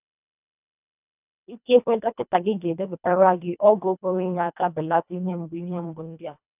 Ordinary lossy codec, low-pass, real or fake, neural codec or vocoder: none; 3.6 kHz; fake; codec, 24 kHz, 1.5 kbps, HILCodec